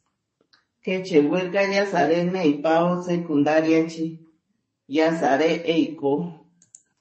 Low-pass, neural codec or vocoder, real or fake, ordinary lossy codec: 10.8 kHz; codec, 44.1 kHz, 2.6 kbps, SNAC; fake; MP3, 32 kbps